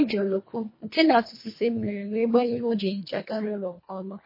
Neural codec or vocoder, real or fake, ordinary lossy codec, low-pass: codec, 24 kHz, 1.5 kbps, HILCodec; fake; MP3, 24 kbps; 5.4 kHz